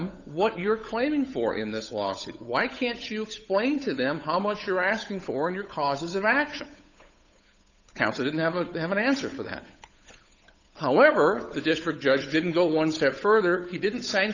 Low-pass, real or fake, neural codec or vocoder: 7.2 kHz; fake; codec, 16 kHz, 8 kbps, FunCodec, trained on Chinese and English, 25 frames a second